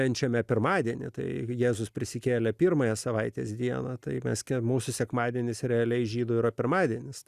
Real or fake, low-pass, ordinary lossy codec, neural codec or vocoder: real; 14.4 kHz; Opus, 64 kbps; none